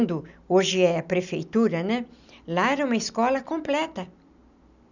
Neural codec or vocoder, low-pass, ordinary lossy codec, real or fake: none; 7.2 kHz; none; real